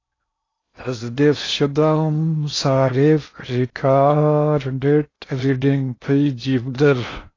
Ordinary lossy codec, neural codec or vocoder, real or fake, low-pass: AAC, 32 kbps; codec, 16 kHz in and 24 kHz out, 0.6 kbps, FocalCodec, streaming, 2048 codes; fake; 7.2 kHz